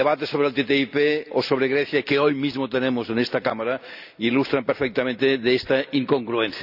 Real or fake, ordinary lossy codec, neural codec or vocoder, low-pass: real; none; none; 5.4 kHz